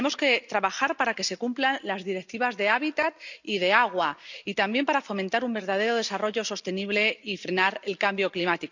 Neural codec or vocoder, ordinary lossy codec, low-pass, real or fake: none; none; 7.2 kHz; real